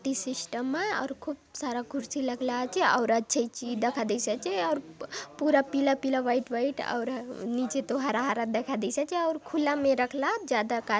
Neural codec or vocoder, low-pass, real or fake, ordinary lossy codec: none; none; real; none